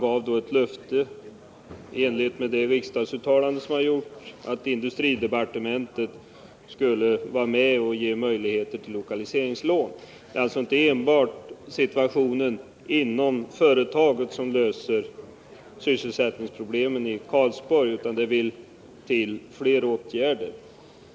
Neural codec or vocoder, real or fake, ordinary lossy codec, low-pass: none; real; none; none